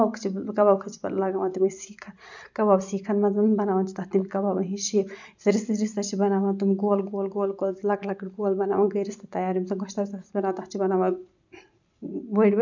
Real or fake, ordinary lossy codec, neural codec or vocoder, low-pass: real; none; none; 7.2 kHz